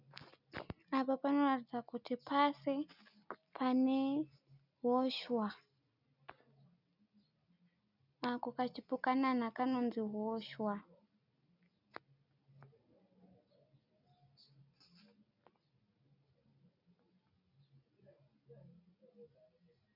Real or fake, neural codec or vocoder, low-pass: real; none; 5.4 kHz